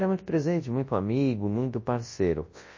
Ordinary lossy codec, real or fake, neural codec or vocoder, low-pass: MP3, 32 kbps; fake; codec, 24 kHz, 0.9 kbps, WavTokenizer, large speech release; 7.2 kHz